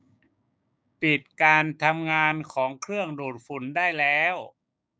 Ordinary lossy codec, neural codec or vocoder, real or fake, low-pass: none; codec, 16 kHz, 6 kbps, DAC; fake; none